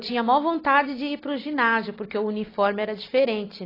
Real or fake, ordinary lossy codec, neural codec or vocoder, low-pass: real; AAC, 24 kbps; none; 5.4 kHz